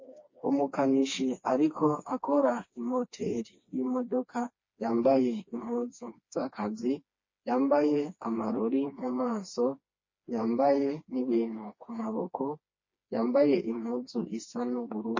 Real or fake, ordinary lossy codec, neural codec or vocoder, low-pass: fake; MP3, 32 kbps; codec, 16 kHz, 2 kbps, FreqCodec, smaller model; 7.2 kHz